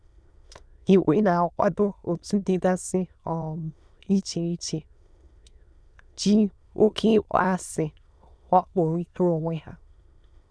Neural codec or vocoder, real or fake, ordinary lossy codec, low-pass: autoencoder, 22.05 kHz, a latent of 192 numbers a frame, VITS, trained on many speakers; fake; none; none